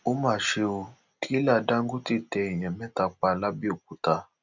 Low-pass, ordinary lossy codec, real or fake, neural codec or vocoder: 7.2 kHz; none; real; none